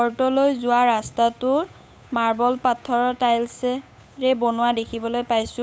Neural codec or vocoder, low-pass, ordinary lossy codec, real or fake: codec, 16 kHz, 8 kbps, FunCodec, trained on Chinese and English, 25 frames a second; none; none; fake